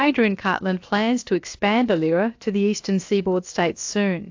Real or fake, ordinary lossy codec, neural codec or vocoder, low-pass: fake; AAC, 48 kbps; codec, 16 kHz, about 1 kbps, DyCAST, with the encoder's durations; 7.2 kHz